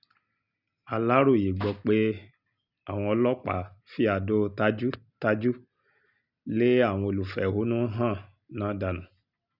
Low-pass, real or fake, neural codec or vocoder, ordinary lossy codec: 5.4 kHz; real; none; none